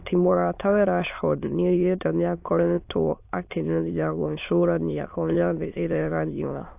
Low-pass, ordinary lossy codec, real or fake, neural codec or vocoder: 3.6 kHz; AAC, 32 kbps; fake; autoencoder, 22.05 kHz, a latent of 192 numbers a frame, VITS, trained on many speakers